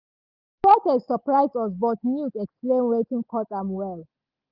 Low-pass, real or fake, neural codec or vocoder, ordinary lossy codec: 5.4 kHz; real; none; Opus, 32 kbps